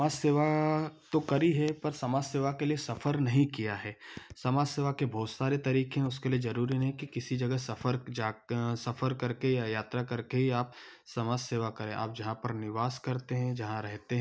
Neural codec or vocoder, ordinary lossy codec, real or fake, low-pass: none; none; real; none